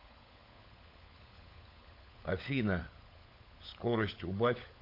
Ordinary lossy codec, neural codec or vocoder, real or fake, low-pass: AAC, 32 kbps; codec, 16 kHz, 16 kbps, FunCodec, trained on LibriTTS, 50 frames a second; fake; 5.4 kHz